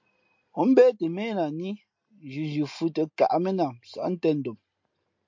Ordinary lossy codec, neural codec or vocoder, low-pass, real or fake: MP3, 48 kbps; none; 7.2 kHz; real